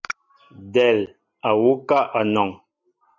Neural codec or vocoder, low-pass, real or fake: none; 7.2 kHz; real